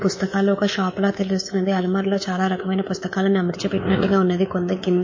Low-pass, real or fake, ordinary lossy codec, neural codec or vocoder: 7.2 kHz; fake; MP3, 32 kbps; codec, 24 kHz, 3.1 kbps, DualCodec